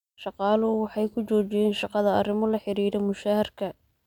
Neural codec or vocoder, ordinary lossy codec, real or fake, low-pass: none; none; real; 19.8 kHz